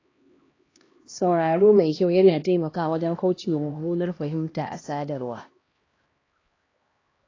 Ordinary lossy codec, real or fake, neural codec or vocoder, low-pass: AAC, 32 kbps; fake; codec, 16 kHz, 1 kbps, X-Codec, HuBERT features, trained on LibriSpeech; 7.2 kHz